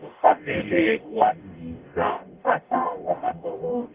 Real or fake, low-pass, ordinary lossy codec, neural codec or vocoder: fake; 3.6 kHz; Opus, 32 kbps; codec, 44.1 kHz, 0.9 kbps, DAC